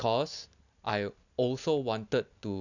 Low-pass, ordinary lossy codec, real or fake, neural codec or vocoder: 7.2 kHz; none; real; none